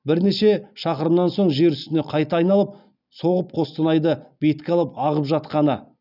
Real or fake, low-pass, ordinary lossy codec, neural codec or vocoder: real; 5.4 kHz; none; none